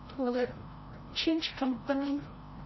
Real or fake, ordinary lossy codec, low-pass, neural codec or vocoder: fake; MP3, 24 kbps; 7.2 kHz; codec, 16 kHz, 0.5 kbps, FreqCodec, larger model